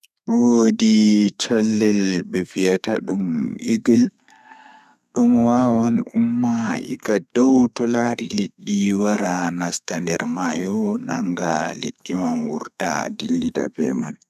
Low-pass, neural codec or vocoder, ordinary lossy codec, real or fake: 14.4 kHz; codec, 32 kHz, 1.9 kbps, SNAC; none; fake